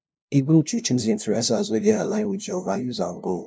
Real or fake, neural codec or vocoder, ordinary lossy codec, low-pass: fake; codec, 16 kHz, 0.5 kbps, FunCodec, trained on LibriTTS, 25 frames a second; none; none